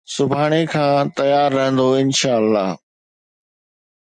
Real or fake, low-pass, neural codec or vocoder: real; 9.9 kHz; none